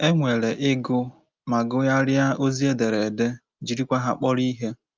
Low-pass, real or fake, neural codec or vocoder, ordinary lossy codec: 7.2 kHz; real; none; Opus, 24 kbps